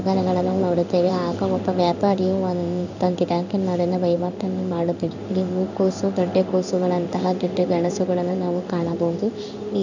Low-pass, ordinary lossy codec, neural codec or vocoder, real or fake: 7.2 kHz; none; codec, 16 kHz in and 24 kHz out, 1 kbps, XY-Tokenizer; fake